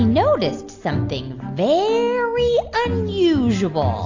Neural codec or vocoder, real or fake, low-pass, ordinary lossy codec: none; real; 7.2 kHz; AAC, 48 kbps